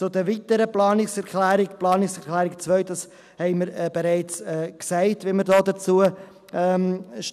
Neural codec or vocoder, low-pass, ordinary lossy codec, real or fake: none; 14.4 kHz; none; real